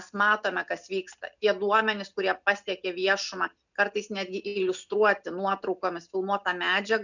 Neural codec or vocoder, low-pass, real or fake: none; 7.2 kHz; real